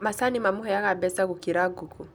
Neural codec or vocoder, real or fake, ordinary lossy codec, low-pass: none; real; none; none